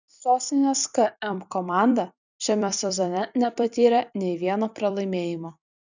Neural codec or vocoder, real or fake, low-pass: vocoder, 44.1 kHz, 128 mel bands every 256 samples, BigVGAN v2; fake; 7.2 kHz